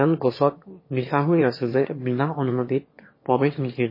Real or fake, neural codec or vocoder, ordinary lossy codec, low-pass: fake; autoencoder, 22.05 kHz, a latent of 192 numbers a frame, VITS, trained on one speaker; MP3, 24 kbps; 5.4 kHz